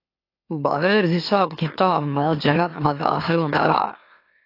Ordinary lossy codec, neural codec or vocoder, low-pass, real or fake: AAC, 32 kbps; autoencoder, 44.1 kHz, a latent of 192 numbers a frame, MeloTTS; 5.4 kHz; fake